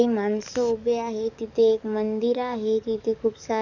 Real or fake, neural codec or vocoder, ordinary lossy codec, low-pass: fake; codec, 44.1 kHz, 7.8 kbps, DAC; none; 7.2 kHz